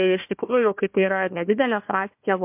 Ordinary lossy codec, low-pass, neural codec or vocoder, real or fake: MP3, 32 kbps; 3.6 kHz; codec, 16 kHz, 1 kbps, FunCodec, trained on Chinese and English, 50 frames a second; fake